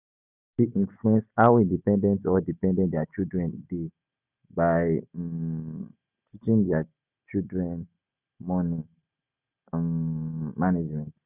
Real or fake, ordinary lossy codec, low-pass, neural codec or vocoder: real; none; 3.6 kHz; none